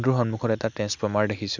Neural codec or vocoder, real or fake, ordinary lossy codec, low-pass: none; real; none; 7.2 kHz